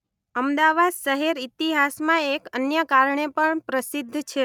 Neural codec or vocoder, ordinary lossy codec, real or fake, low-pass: none; none; real; 19.8 kHz